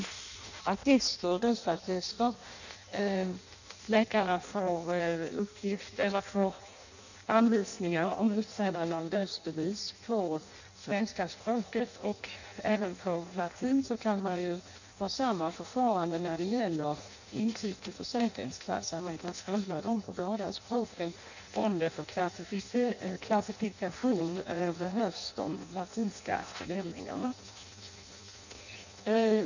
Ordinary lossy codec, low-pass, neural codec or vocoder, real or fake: none; 7.2 kHz; codec, 16 kHz in and 24 kHz out, 0.6 kbps, FireRedTTS-2 codec; fake